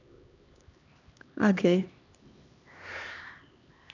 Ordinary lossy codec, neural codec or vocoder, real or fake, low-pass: AAC, 48 kbps; codec, 16 kHz, 2 kbps, X-Codec, HuBERT features, trained on LibriSpeech; fake; 7.2 kHz